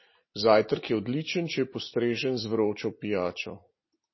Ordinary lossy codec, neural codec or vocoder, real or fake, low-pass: MP3, 24 kbps; none; real; 7.2 kHz